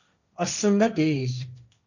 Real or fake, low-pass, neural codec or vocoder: fake; 7.2 kHz; codec, 16 kHz, 1.1 kbps, Voila-Tokenizer